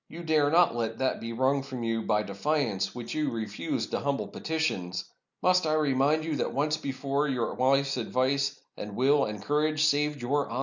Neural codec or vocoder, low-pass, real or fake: none; 7.2 kHz; real